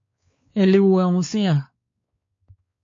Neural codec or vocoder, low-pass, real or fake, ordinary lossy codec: codec, 16 kHz, 4 kbps, X-Codec, WavLM features, trained on Multilingual LibriSpeech; 7.2 kHz; fake; MP3, 48 kbps